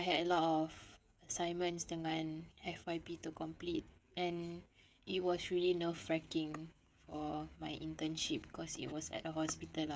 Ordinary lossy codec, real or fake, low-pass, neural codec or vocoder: none; fake; none; codec, 16 kHz, 16 kbps, FreqCodec, smaller model